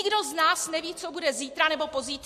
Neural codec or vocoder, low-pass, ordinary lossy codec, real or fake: none; 14.4 kHz; MP3, 64 kbps; real